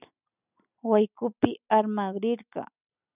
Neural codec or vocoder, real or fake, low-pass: none; real; 3.6 kHz